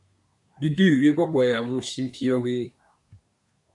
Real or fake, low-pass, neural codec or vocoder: fake; 10.8 kHz; codec, 24 kHz, 1 kbps, SNAC